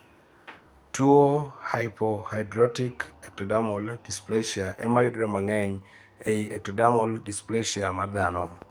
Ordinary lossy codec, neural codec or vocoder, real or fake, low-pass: none; codec, 44.1 kHz, 2.6 kbps, SNAC; fake; none